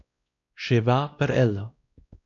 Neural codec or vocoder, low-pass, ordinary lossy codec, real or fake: codec, 16 kHz, 1 kbps, X-Codec, WavLM features, trained on Multilingual LibriSpeech; 7.2 kHz; MP3, 96 kbps; fake